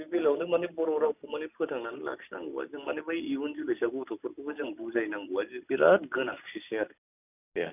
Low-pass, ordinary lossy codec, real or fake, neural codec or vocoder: 3.6 kHz; none; real; none